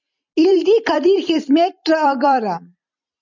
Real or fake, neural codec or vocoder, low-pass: fake; vocoder, 44.1 kHz, 128 mel bands every 256 samples, BigVGAN v2; 7.2 kHz